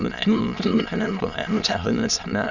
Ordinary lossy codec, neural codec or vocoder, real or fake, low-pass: none; autoencoder, 22.05 kHz, a latent of 192 numbers a frame, VITS, trained on many speakers; fake; 7.2 kHz